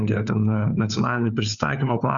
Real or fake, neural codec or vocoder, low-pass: fake; codec, 16 kHz, 4 kbps, FunCodec, trained on LibriTTS, 50 frames a second; 7.2 kHz